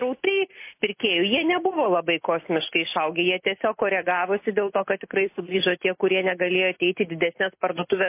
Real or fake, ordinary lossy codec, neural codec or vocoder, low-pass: real; MP3, 24 kbps; none; 3.6 kHz